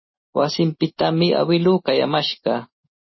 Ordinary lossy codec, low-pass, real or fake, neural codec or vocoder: MP3, 24 kbps; 7.2 kHz; real; none